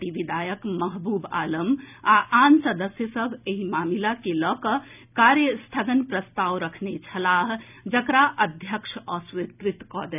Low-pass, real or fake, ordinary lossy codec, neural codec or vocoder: 3.6 kHz; real; none; none